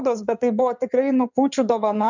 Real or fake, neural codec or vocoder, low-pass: fake; codec, 16 kHz in and 24 kHz out, 2.2 kbps, FireRedTTS-2 codec; 7.2 kHz